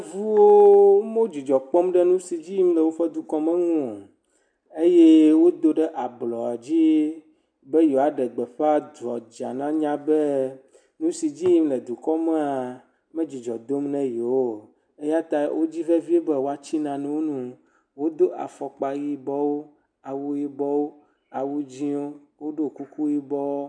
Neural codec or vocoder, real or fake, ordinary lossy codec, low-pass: none; real; MP3, 96 kbps; 9.9 kHz